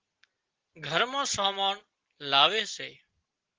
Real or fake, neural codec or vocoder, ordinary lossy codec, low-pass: real; none; Opus, 16 kbps; 7.2 kHz